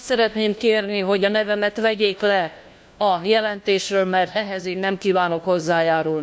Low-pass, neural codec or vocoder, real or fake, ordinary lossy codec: none; codec, 16 kHz, 1 kbps, FunCodec, trained on LibriTTS, 50 frames a second; fake; none